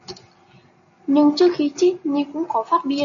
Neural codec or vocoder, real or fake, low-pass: none; real; 7.2 kHz